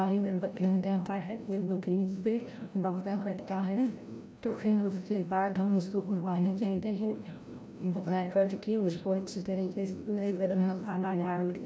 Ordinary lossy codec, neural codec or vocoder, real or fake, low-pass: none; codec, 16 kHz, 0.5 kbps, FreqCodec, larger model; fake; none